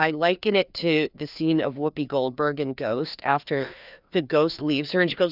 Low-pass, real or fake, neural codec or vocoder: 5.4 kHz; fake; codec, 16 kHz, 2 kbps, FreqCodec, larger model